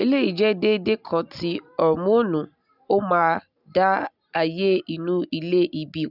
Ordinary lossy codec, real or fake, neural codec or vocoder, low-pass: none; real; none; 5.4 kHz